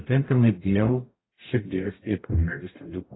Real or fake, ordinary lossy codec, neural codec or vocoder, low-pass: fake; AAC, 16 kbps; codec, 44.1 kHz, 0.9 kbps, DAC; 7.2 kHz